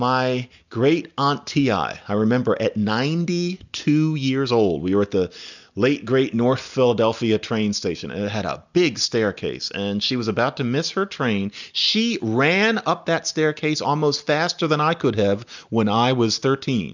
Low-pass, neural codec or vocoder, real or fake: 7.2 kHz; none; real